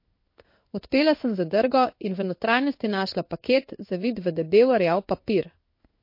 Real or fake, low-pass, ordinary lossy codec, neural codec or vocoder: fake; 5.4 kHz; MP3, 32 kbps; codec, 16 kHz in and 24 kHz out, 1 kbps, XY-Tokenizer